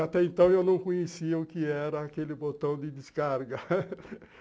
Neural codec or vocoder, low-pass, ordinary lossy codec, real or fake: none; none; none; real